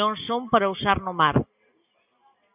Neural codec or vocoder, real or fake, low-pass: none; real; 3.6 kHz